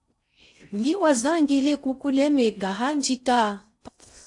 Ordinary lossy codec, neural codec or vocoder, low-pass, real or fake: AAC, 64 kbps; codec, 16 kHz in and 24 kHz out, 0.6 kbps, FocalCodec, streaming, 4096 codes; 10.8 kHz; fake